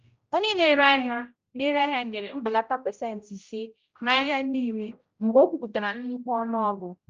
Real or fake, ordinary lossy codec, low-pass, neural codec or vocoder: fake; Opus, 24 kbps; 7.2 kHz; codec, 16 kHz, 0.5 kbps, X-Codec, HuBERT features, trained on general audio